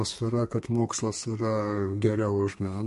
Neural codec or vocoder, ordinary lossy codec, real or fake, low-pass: codec, 32 kHz, 1.9 kbps, SNAC; MP3, 48 kbps; fake; 14.4 kHz